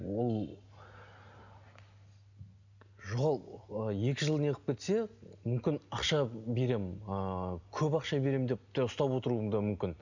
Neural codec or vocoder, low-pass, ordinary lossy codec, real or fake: none; 7.2 kHz; none; real